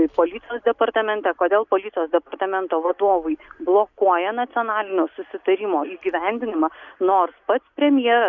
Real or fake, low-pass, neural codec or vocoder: real; 7.2 kHz; none